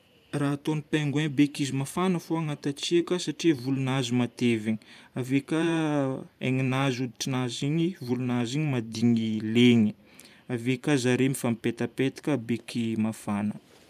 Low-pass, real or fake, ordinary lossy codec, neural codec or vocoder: 14.4 kHz; fake; none; vocoder, 44.1 kHz, 128 mel bands every 512 samples, BigVGAN v2